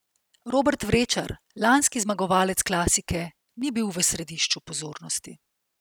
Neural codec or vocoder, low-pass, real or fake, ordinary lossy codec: none; none; real; none